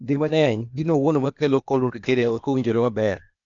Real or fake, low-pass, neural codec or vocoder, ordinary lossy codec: fake; 7.2 kHz; codec, 16 kHz, 0.8 kbps, ZipCodec; none